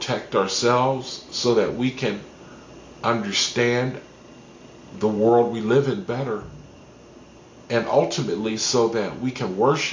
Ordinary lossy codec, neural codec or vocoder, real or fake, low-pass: MP3, 48 kbps; none; real; 7.2 kHz